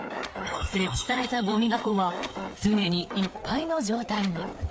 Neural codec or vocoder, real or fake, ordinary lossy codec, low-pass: codec, 16 kHz, 4 kbps, FreqCodec, larger model; fake; none; none